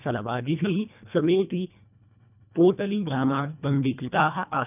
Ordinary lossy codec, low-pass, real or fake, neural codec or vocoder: none; 3.6 kHz; fake; codec, 24 kHz, 1.5 kbps, HILCodec